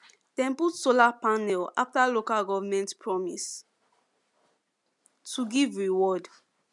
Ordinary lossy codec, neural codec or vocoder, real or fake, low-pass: none; none; real; 10.8 kHz